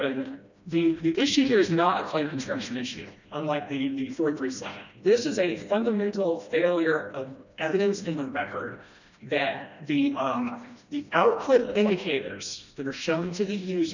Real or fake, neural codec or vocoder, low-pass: fake; codec, 16 kHz, 1 kbps, FreqCodec, smaller model; 7.2 kHz